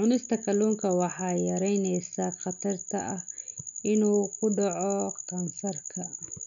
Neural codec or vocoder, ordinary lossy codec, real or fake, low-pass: none; none; real; 7.2 kHz